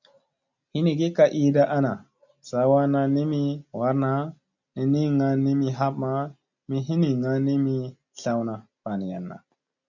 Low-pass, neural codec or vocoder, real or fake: 7.2 kHz; none; real